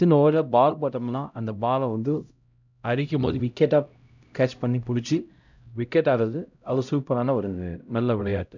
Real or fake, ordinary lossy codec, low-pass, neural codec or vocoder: fake; none; 7.2 kHz; codec, 16 kHz, 0.5 kbps, X-Codec, HuBERT features, trained on LibriSpeech